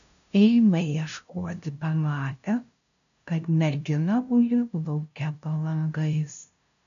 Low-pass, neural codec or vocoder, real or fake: 7.2 kHz; codec, 16 kHz, 0.5 kbps, FunCodec, trained on LibriTTS, 25 frames a second; fake